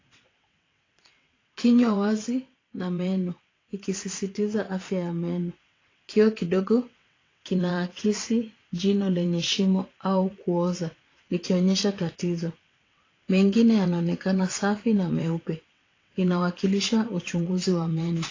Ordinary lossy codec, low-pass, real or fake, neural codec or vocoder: AAC, 32 kbps; 7.2 kHz; fake; vocoder, 22.05 kHz, 80 mel bands, WaveNeXt